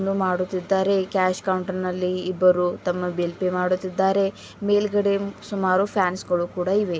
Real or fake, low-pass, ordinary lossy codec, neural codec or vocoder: real; none; none; none